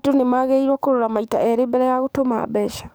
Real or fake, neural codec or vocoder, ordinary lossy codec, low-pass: fake; codec, 44.1 kHz, 7.8 kbps, DAC; none; none